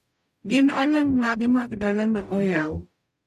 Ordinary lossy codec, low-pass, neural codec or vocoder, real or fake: AAC, 96 kbps; 14.4 kHz; codec, 44.1 kHz, 0.9 kbps, DAC; fake